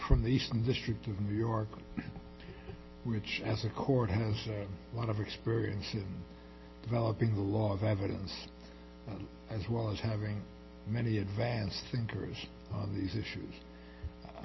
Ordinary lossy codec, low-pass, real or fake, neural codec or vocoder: MP3, 24 kbps; 7.2 kHz; real; none